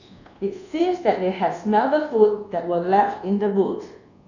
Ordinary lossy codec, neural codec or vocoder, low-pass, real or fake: none; codec, 24 kHz, 1.2 kbps, DualCodec; 7.2 kHz; fake